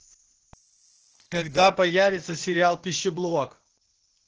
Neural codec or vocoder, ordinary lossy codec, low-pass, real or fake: codec, 16 kHz, 0.8 kbps, ZipCodec; Opus, 16 kbps; 7.2 kHz; fake